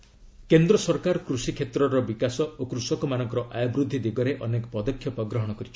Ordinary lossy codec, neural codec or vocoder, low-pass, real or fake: none; none; none; real